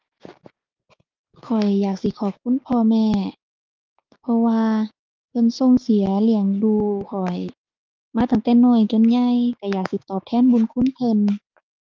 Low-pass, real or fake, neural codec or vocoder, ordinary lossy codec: 7.2 kHz; fake; codec, 16 kHz, 6 kbps, DAC; Opus, 32 kbps